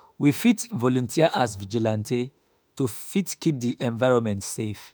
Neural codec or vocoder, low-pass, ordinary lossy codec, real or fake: autoencoder, 48 kHz, 32 numbers a frame, DAC-VAE, trained on Japanese speech; none; none; fake